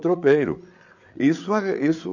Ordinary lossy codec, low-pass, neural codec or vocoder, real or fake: none; 7.2 kHz; codec, 16 kHz, 8 kbps, FreqCodec, larger model; fake